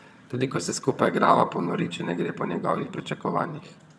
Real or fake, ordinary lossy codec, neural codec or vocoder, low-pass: fake; none; vocoder, 22.05 kHz, 80 mel bands, HiFi-GAN; none